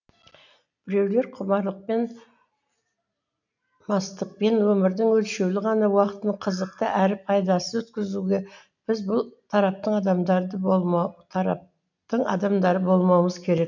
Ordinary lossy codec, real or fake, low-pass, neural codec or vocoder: none; real; 7.2 kHz; none